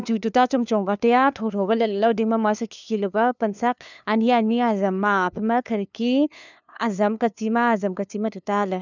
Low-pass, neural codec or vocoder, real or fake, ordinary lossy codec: 7.2 kHz; codec, 16 kHz, 2 kbps, FunCodec, trained on LibriTTS, 25 frames a second; fake; none